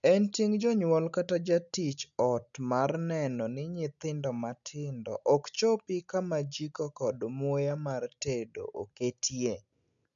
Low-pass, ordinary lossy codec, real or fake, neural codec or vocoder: 7.2 kHz; none; real; none